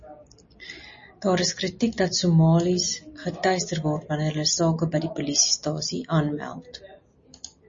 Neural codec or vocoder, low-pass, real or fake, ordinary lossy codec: none; 7.2 kHz; real; MP3, 32 kbps